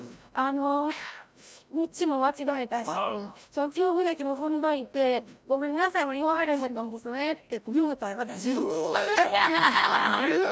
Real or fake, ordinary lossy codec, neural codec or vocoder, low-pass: fake; none; codec, 16 kHz, 0.5 kbps, FreqCodec, larger model; none